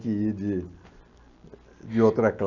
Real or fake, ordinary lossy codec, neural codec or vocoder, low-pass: real; none; none; 7.2 kHz